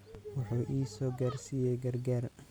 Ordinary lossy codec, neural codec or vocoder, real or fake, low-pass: none; none; real; none